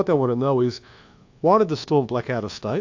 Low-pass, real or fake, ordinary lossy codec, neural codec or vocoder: 7.2 kHz; fake; AAC, 48 kbps; codec, 24 kHz, 1.2 kbps, DualCodec